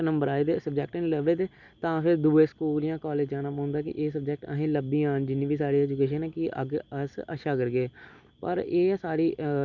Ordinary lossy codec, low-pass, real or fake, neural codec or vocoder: none; 7.2 kHz; real; none